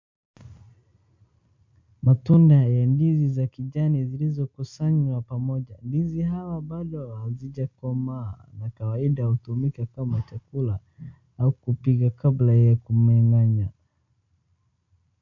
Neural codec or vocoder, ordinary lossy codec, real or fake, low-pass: none; MP3, 48 kbps; real; 7.2 kHz